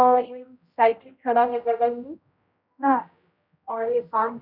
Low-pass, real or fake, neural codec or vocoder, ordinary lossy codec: 5.4 kHz; fake; codec, 16 kHz, 0.5 kbps, X-Codec, HuBERT features, trained on general audio; none